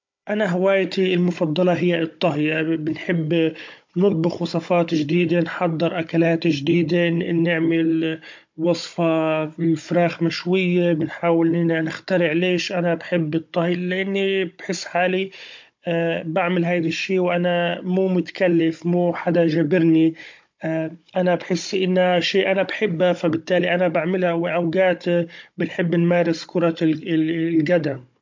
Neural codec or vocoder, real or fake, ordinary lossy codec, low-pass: codec, 16 kHz, 16 kbps, FunCodec, trained on Chinese and English, 50 frames a second; fake; MP3, 48 kbps; 7.2 kHz